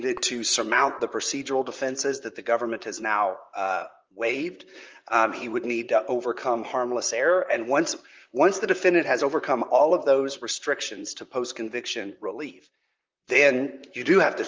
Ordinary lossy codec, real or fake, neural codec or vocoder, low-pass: Opus, 24 kbps; fake; vocoder, 44.1 kHz, 128 mel bands, Pupu-Vocoder; 7.2 kHz